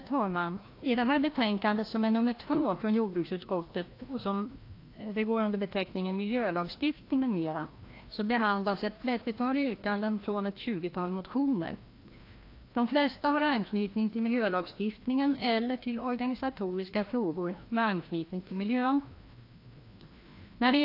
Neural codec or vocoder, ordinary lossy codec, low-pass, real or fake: codec, 16 kHz, 1 kbps, FreqCodec, larger model; AAC, 32 kbps; 5.4 kHz; fake